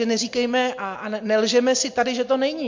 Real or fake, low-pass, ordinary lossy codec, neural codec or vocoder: real; 7.2 kHz; MP3, 48 kbps; none